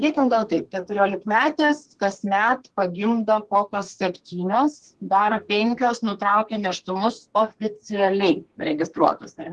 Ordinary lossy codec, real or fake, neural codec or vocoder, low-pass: Opus, 16 kbps; fake; codec, 32 kHz, 1.9 kbps, SNAC; 10.8 kHz